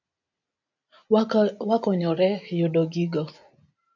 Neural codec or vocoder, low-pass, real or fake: none; 7.2 kHz; real